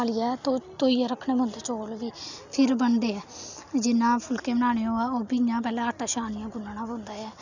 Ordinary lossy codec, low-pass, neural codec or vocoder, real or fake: none; 7.2 kHz; none; real